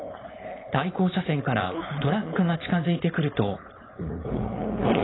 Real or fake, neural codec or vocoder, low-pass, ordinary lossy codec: fake; codec, 16 kHz, 4.8 kbps, FACodec; 7.2 kHz; AAC, 16 kbps